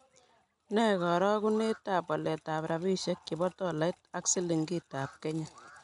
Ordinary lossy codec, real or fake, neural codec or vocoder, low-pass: none; real; none; 10.8 kHz